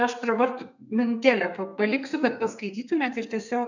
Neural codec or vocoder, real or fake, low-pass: codec, 32 kHz, 1.9 kbps, SNAC; fake; 7.2 kHz